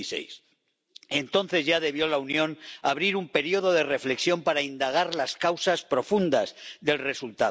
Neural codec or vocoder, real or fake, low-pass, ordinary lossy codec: none; real; none; none